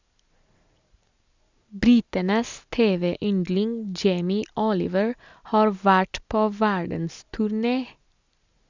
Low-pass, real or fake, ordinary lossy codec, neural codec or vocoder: 7.2 kHz; real; Opus, 64 kbps; none